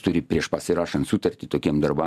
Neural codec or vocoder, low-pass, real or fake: none; 14.4 kHz; real